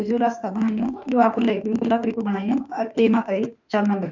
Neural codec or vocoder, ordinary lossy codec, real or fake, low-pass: autoencoder, 48 kHz, 32 numbers a frame, DAC-VAE, trained on Japanese speech; none; fake; 7.2 kHz